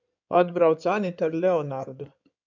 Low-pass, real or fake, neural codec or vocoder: 7.2 kHz; fake; codec, 16 kHz in and 24 kHz out, 2.2 kbps, FireRedTTS-2 codec